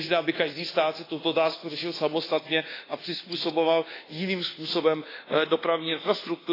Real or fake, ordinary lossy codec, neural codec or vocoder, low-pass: fake; AAC, 24 kbps; codec, 24 kHz, 1.2 kbps, DualCodec; 5.4 kHz